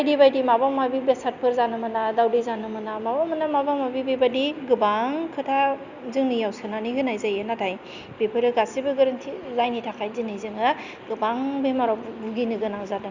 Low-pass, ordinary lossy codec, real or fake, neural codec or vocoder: 7.2 kHz; Opus, 64 kbps; real; none